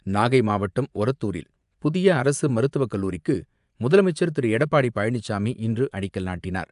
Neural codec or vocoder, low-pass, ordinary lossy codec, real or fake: vocoder, 24 kHz, 100 mel bands, Vocos; 10.8 kHz; AAC, 96 kbps; fake